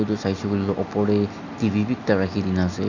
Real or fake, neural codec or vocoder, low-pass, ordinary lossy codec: real; none; 7.2 kHz; none